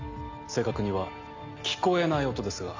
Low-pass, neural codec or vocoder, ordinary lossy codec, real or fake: 7.2 kHz; none; MP3, 64 kbps; real